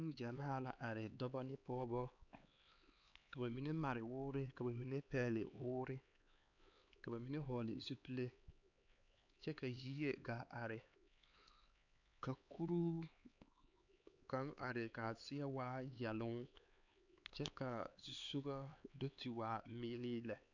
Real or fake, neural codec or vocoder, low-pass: fake; codec, 16 kHz, 4 kbps, X-Codec, HuBERT features, trained on LibriSpeech; 7.2 kHz